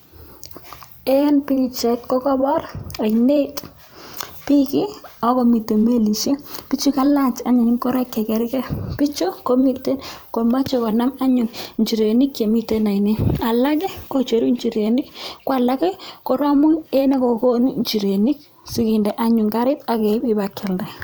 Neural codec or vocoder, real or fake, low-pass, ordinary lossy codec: vocoder, 44.1 kHz, 128 mel bands every 512 samples, BigVGAN v2; fake; none; none